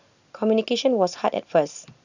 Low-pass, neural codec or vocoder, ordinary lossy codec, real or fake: 7.2 kHz; none; none; real